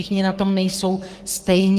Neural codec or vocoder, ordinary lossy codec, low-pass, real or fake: codec, 44.1 kHz, 3.4 kbps, Pupu-Codec; Opus, 24 kbps; 14.4 kHz; fake